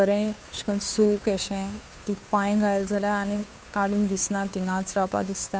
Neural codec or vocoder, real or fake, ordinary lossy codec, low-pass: codec, 16 kHz, 2 kbps, FunCodec, trained on Chinese and English, 25 frames a second; fake; none; none